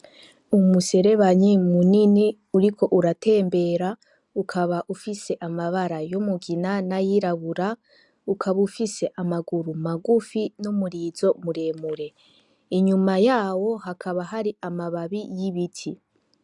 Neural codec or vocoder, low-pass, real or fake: none; 10.8 kHz; real